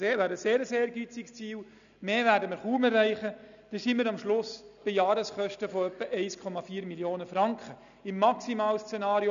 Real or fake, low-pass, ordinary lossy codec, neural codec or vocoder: real; 7.2 kHz; none; none